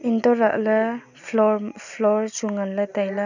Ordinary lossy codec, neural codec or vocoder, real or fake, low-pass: none; none; real; 7.2 kHz